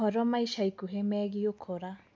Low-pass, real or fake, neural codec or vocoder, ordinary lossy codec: none; real; none; none